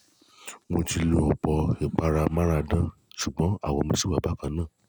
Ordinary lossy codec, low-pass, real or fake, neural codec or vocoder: none; none; real; none